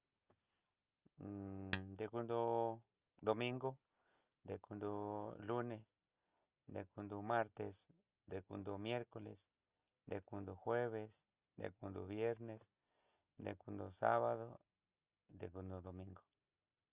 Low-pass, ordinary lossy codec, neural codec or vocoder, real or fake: 3.6 kHz; Opus, 24 kbps; none; real